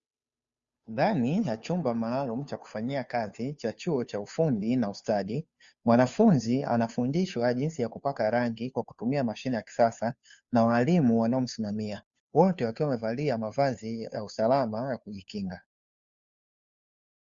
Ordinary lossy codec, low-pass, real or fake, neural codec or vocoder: Opus, 64 kbps; 7.2 kHz; fake; codec, 16 kHz, 2 kbps, FunCodec, trained on Chinese and English, 25 frames a second